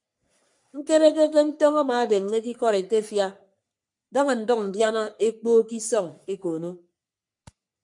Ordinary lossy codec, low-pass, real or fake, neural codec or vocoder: MP3, 64 kbps; 10.8 kHz; fake; codec, 44.1 kHz, 3.4 kbps, Pupu-Codec